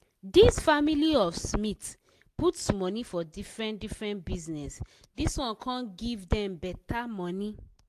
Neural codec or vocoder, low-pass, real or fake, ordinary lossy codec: none; 14.4 kHz; real; AAC, 64 kbps